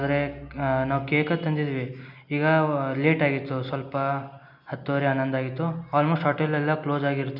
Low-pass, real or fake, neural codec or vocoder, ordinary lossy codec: 5.4 kHz; real; none; none